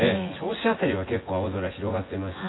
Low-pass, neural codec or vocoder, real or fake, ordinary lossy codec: 7.2 kHz; vocoder, 24 kHz, 100 mel bands, Vocos; fake; AAC, 16 kbps